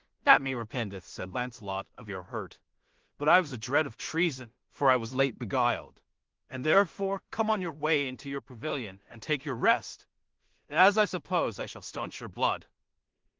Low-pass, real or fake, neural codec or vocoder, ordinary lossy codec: 7.2 kHz; fake; codec, 16 kHz in and 24 kHz out, 0.4 kbps, LongCat-Audio-Codec, two codebook decoder; Opus, 16 kbps